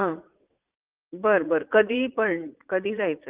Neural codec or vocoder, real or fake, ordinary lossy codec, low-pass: vocoder, 44.1 kHz, 80 mel bands, Vocos; fake; Opus, 32 kbps; 3.6 kHz